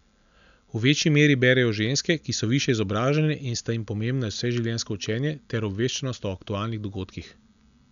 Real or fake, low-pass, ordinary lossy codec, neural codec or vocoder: real; 7.2 kHz; none; none